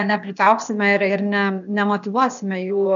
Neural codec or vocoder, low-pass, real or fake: codec, 16 kHz, 0.8 kbps, ZipCodec; 7.2 kHz; fake